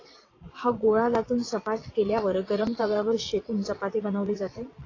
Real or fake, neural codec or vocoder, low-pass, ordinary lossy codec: fake; vocoder, 22.05 kHz, 80 mel bands, WaveNeXt; 7.2 kHz; AAC, 32 kbps